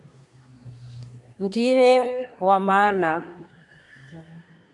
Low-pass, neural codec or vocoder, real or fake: 10.8 kHz; codec, 24 kHz, 1 kbps, SNAC; fake